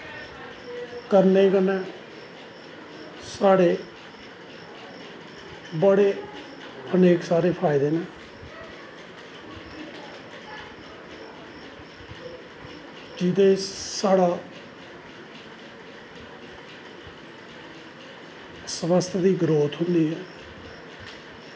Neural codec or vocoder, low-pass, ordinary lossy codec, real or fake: none; none; none; real